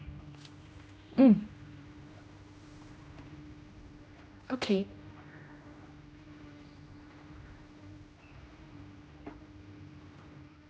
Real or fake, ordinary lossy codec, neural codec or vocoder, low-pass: fake; none; codec, 16 kHz, 1 kbps, X-Codec, HuBERT features, trained on general audio; none